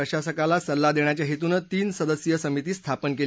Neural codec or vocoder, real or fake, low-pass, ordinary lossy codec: none; real; none; none